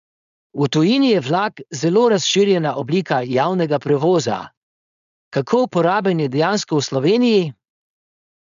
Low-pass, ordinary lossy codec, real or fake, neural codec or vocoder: 7.2 kHz; none; fake; codec, 16 kHz, 4.8 kbps, FACodec